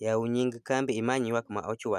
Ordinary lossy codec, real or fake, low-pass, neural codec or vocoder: none; real; 14.4 kHz; none